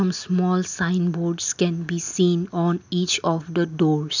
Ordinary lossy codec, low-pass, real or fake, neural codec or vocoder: none; 7.2 kHz; real; none